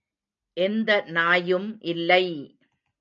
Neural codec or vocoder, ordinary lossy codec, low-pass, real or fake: none; AAC, 64 kbps; 7.2 kHz; real